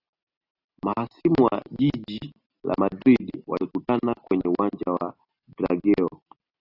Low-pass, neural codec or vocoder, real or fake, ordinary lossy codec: 5.4 kHz; none; real; AAC, 32 kbps